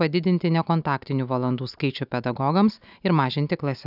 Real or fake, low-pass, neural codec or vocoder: real; 5.4 kHz; none